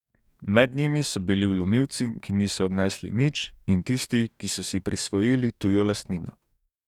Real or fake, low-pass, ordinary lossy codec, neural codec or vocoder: fake; 19.8 kHz; none; codec, 44.1 kHz, 2.6 kbps, DAC